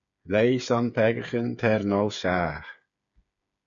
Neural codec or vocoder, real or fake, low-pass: codec, 16 kHz, 8 kbps, FreqCodec, smaller model; fake; 7.2 kHz